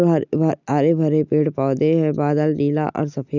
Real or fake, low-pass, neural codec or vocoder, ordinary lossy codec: real; 7.2 kHz; none; none